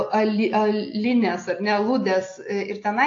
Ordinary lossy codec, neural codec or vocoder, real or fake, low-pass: AAC, 48 kbps; none; real; 7.2 kHz